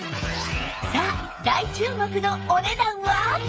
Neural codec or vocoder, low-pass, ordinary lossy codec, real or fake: codec, 16 kHz, 8 kbps, FreqCodec, smaller model; none; none; fake